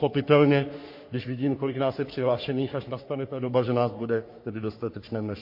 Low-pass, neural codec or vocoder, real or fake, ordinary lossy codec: 5.4 kHz; codec, 44.1 kHz, 3.4 kbps, Pupu-Codec; fake; MP3, 32 kbps